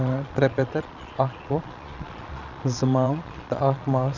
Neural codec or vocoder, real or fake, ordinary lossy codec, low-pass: vocoder, 22.05 kHz, 80 mel bands, Vocos; fake; none; 7.2 kHz